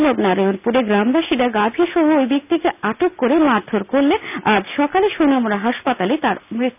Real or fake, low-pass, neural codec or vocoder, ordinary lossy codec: real; 3.6 kHz; none; none